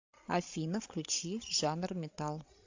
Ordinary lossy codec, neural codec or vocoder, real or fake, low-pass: AAC, 48 kbps; codec, 16 kHz, 16 kbps, FreqCodec, larger model; fake; 7.2 kHz